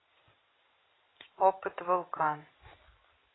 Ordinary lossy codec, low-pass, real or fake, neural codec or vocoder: AAC, 16 kbps; 7.2 kHz; real; none